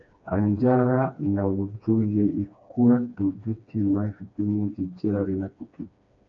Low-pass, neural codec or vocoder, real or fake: 7.2 kHz; codec, 16 kHz, 2 kbps, FreqCodec, smaller model; fake